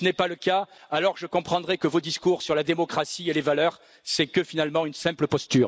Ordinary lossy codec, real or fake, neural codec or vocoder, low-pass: none; real; none; none